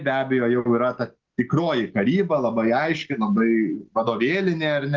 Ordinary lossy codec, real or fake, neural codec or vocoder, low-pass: Opus, 16 kbps; real; none; 7.2 kHz